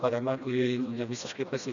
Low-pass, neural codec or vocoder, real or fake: 7.2 kHz; codec, 16 kHz, 1 kbps, FreqCodec, smaller model; fake